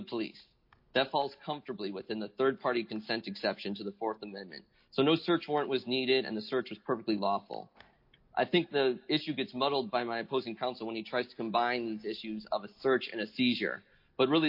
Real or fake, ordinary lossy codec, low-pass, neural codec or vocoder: real; MP3, 32 kbps; 5.4 kHz; none